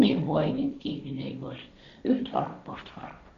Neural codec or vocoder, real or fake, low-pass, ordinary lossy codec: codec, 16 kHz, 1.1 kbps, Voila-Tokenizer; fake; 7.2 kHz; AAC, 96 kbps